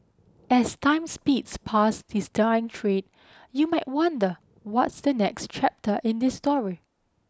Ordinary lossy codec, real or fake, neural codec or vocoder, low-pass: none; real; none; none